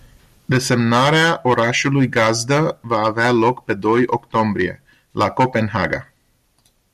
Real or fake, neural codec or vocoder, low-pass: real; none; 14.4 kHz